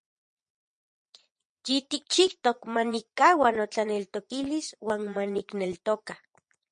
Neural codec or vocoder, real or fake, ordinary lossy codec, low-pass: vocoder, 22.05 kHz, 80 mel bands, Vocos; fake; MP3, 48 kbps; 9.9 kHz